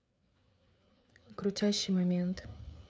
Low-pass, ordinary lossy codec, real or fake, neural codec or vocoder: none; none; fake; codec, 16 kHz, 4 kbps, FreqCodec, larger model